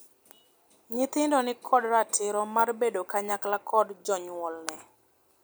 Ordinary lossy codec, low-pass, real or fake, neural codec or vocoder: none; none; real; none